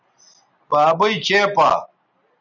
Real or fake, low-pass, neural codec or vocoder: real; 7.2 kHz; none